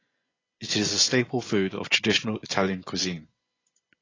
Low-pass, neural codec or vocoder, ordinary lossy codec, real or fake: 7.2 kHz; vocoder, 24 kHz, 100 mel bands, Vocos; AAC, 32 kbps; fake